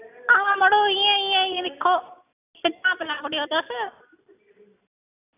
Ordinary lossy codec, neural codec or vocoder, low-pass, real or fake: none; none; 3.6 kHz; real